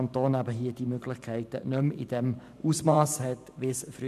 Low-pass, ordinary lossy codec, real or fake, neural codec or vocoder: 14.4 kHz; none; fake; vocoder, 44.1 kHz, 128 mel bands every 512 samples, BigVGAN v2